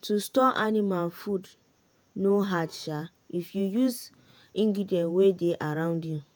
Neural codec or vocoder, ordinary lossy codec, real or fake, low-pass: vocoder, 48 kHz, 128 mel bands, Vocos; none; fake; none